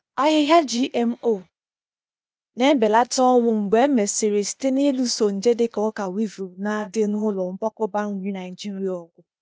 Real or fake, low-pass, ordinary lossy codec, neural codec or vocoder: fake; none; none; codec, 16 kHz, 0.8 kbps, ZipCodec